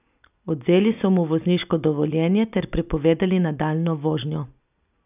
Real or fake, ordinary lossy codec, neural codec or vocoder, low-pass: real; none; none; 3.6 kHz